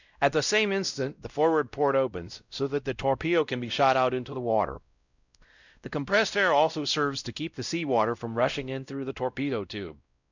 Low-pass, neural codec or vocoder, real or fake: 7.2 kHz; codec, 16 kHz, 0.5 kbps, X-Codec, WavLM features, trained on Multilingual LibriSpeech; fake